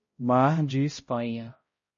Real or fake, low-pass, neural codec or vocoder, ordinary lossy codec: fake; 7.2 kHz; codec, 16 kHz, 0.5 kbps, X-Codec, HuBERT features, trained on balanced general audio; MP3, 32 kbps